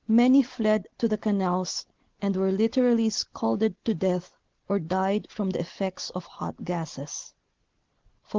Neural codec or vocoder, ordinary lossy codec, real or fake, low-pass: none; Opus, 16 kbps; real; 7.2 kHz